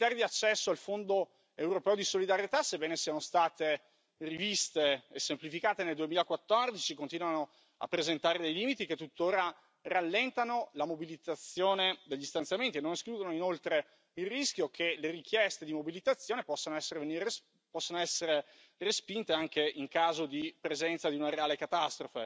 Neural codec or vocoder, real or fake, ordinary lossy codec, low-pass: none; real; none; none